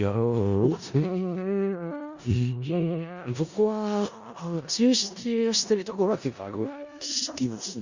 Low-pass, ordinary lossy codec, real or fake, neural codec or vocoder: 7.2 kHz; Opus, 64 kbps; fake; codec, 16 kHz in and 24 kHz out, 0.4 kbps, LongCat-Audio-Codec, four codebook decoder